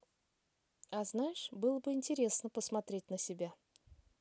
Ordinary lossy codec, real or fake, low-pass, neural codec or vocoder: none; real; none; none